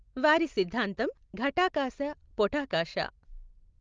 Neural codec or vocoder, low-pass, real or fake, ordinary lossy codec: none; 7.2 kHz; real; Opus, 32 kbps